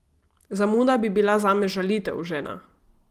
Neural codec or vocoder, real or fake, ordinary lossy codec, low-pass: none; real; Opus, 24 kbps; 14.4 kHz